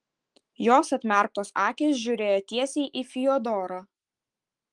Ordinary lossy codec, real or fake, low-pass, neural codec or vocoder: Opus, 24 kbps; fake; 10.8 kHz; autoencoder, 48 kHz, 128 numbers a frame, DAC-VAE, trained on Japanese speech